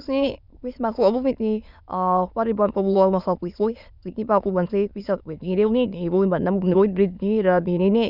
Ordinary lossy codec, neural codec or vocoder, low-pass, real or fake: none; autoencoder, 22.05 kHz, a latent of 192 numbers a frame, VITS, trained on many speakers; 5.4 kHz; fake